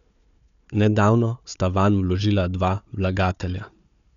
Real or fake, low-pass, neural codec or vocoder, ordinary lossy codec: fake; 7.2 kHz; codec, 16 kHz, 4 kbps, FunCodec, trained on Chinese and English, 50 frames a second; none